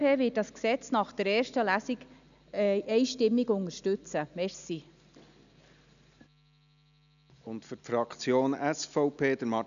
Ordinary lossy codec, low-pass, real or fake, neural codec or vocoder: none; 7.2 kHz; real; none